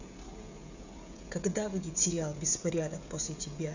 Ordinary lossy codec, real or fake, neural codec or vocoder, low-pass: none; fake; codec, 16 kHz, 16 kbps, FreqCodec, smaller model; 7.2 kHz